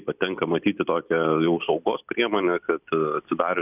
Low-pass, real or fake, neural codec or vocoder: 3.6 kHz; real; none